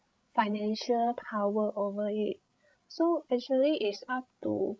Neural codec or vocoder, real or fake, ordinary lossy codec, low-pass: codec, 16 kHz, 16 kbps, FreqCodec, larger model; fake; none; none